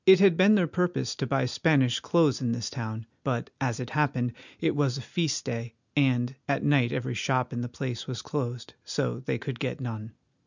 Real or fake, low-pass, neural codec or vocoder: real; 7.2 kHz; none